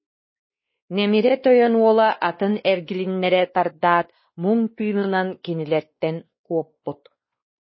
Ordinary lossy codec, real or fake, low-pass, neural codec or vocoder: MP3, 24 kbps; fake; 7.2 kHz; codec, 16 kHz, 2 kbps, X-Codec, WavLM features, trained on Multilingual LibriSpeech